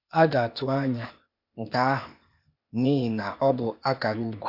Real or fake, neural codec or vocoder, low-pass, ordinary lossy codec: fake; codec, 16 kHz, 0.8 kbps, ZipCodec; 5.4 kHz; none